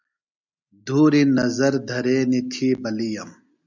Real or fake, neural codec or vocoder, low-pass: real; none; 7.2 kHz